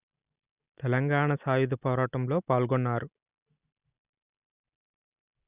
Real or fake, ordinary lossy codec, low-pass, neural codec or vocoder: real; Opus, 64 kbps; 3.6 kHz; none